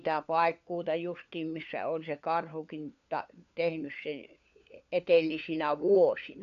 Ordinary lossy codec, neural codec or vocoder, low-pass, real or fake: none; codec, 16 kHz, 4 kbps, FunCodec, trained on LibriTTS, 50 frames a second; 7.2 kHz; fake